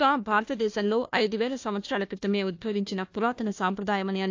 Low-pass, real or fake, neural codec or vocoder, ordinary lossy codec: 7.2 kHz; fake; codec, 16 kHz, 1 kbps, FunCodec, trained on Chinese and English, 50 frames a second; AAC, 48 kbps